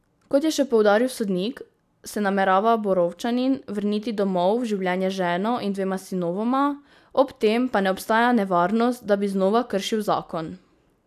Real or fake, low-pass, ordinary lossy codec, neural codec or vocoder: real; 14.4 kHz; none; none